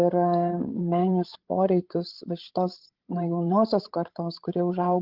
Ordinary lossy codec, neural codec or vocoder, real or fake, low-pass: Opus, 16 kbps; codec, 16 kHz, 16 kbps, FreqCodec, larger model; fake; 5.4 kHz